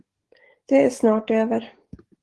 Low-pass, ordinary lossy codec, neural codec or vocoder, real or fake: 10.8 kHz; Opus, 16 kbps; codec, 44.1 kHz, 7.8 kbps, DAC; fake